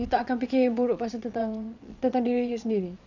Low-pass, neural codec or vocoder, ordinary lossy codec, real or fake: 7.2 kHz; vocoder, 22.05 kHz, 80 mel bands, Vocos; none; fake